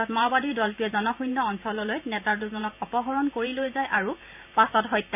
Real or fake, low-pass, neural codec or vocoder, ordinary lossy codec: fake; 3.6 kHz; vocoder, 44.1 kHz, 128 mel bands every 512 samples, BigVGAN v2; none